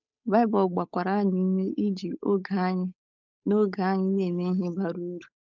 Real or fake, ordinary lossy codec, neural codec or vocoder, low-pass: fake; none; codec, 16 kHz, 8 kbps, FunCodec, trained on Chinese and English, 25 frames a second; 7.2 kHz